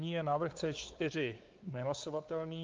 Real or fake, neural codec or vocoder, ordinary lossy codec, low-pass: fake; codec, 16 kHz, 4 kbps, X-Codec, WavLM features, trained on Multilingual LibriSpeech; Opus, 32 kbps; 7.2 kHz